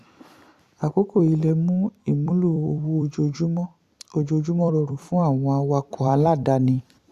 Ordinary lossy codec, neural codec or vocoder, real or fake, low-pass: Opus, 64 kbps; vocoder, 44.1 kHz, 128 mel bands every 256 samples, BigVGAN v2; fake; 14.4 kHz